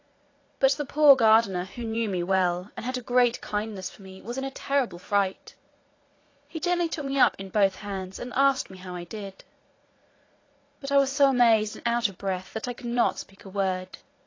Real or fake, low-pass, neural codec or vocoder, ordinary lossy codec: fake; 7.2 kHz; vocoder, 44.1 kHz, 80 mel bands, Vocos; AAC, 32 kbps